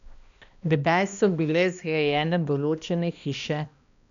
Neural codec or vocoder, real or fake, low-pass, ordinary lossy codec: codec, 16 kHz, 1 kbps, X-Codec, HuBERT features, trained on balanced general audio; fake; 7.2 kHz; none